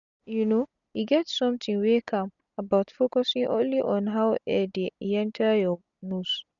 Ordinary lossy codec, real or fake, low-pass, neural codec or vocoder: none; real; 7.2 kHz; none